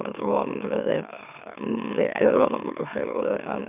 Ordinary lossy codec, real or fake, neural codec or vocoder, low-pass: none; fake; autoencoder, 44.1 kHz, a latent of 192 numbers a frame, MeloTTS; 3.6 kHz